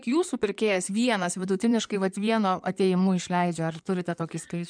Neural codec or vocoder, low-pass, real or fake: codec, 16 kHz in and 24 kHz out, 2.2 kbps, FireRedTTS-2 codec; 9.9 kHz; fake